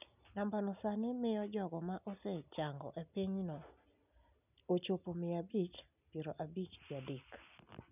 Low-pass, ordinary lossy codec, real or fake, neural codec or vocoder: 3.6 kHz; none; real; none